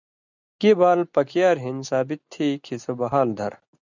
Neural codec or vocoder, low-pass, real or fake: none; 7.2 kHz; real